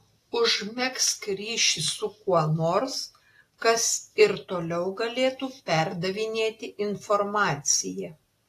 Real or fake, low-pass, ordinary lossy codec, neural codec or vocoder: real; 14.4 kHz; AAC, 48 kbps; none